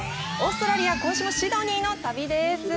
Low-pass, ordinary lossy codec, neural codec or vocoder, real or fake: none; none; none; real